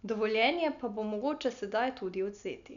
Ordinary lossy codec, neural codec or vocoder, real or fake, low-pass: none; none; real; 7.2 kHz